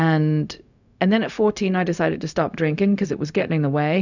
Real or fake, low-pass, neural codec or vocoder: fake; 7.2 kHz; codec, 16 kHz, 0.4 kbps, LongCat-Audio-Codec